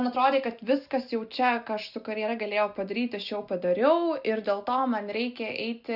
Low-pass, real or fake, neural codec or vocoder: 5.4 kHz; real; none